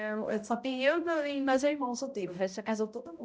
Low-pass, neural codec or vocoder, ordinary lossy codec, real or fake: none; codec, 16 kHz, 0.5 kbps, X-Codec, HuBERT features, trained on balanced general audio; none; fake